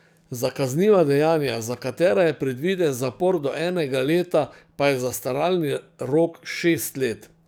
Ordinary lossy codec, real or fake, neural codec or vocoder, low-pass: none; fake; codec, 44.1 kHz, 7.8 kbps, DAC; none